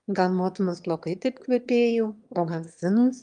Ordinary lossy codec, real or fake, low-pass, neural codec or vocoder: Opus, 32 kbps; fake; 9.9 kHz; autoencoder, 22.05 kHz, a latent of 192 numbers a frame, VITS, trained on one speaker